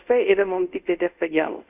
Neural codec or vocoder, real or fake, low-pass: codec, 24 kHz, 0.5 kbps, DualCodec; fake; 3.6 kHz